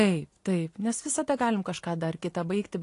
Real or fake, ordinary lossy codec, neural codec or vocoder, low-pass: real; AAC, 48 kbps; none; 10.8 kHz